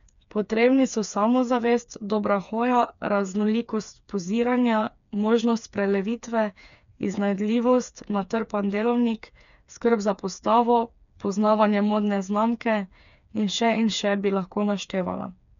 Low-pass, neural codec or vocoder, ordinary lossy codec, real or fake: 7.2 kHz; codec, 16 kHz, 4 kbps, FreqCodec, smaller model; none; fake